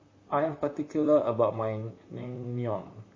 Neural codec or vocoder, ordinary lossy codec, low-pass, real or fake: vocoder, 44.1 kHz, 128 mel bands, Pupu-Vocoder; MP3, 32 kbps; 7.2 kHz; fake